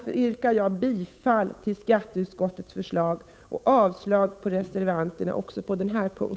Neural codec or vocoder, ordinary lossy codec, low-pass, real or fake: codec, 16 kHz, 8 kbps, FunCodec, trained on Chinese and English, 25 frames a second; none; none; fake